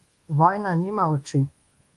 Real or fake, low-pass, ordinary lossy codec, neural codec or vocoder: fake; 10.8 kHz; Opus, 24 kbps; codec, 24 kHz, 1.2 kbps, DualCodec